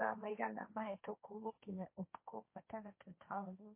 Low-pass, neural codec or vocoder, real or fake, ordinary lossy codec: 3.6 kHz; codec, 16 kHz, 1.1 kbps, Voila-Tokenizer; fake; MP3, 24 kbps